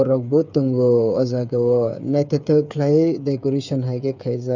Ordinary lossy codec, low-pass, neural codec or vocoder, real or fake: none; 7.2 kHz; codec, 16 kHz, 8 kbps, FreqCodec, smaller model; fake